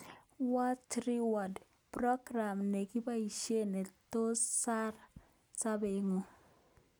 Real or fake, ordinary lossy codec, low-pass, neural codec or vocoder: real; none; none; none